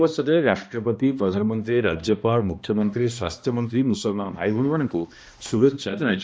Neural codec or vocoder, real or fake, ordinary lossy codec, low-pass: codec, 16 kHz, 1 kbps, X-Codec, HuBERT features, trained on balanced general audio; fake; none; none